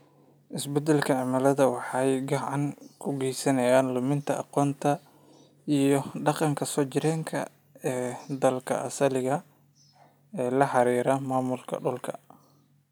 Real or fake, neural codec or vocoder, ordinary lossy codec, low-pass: real; none; none; none